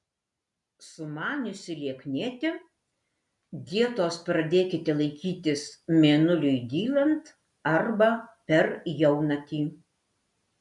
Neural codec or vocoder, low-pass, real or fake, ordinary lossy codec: none; 10.8 kHz; real; MP3, 96 kbps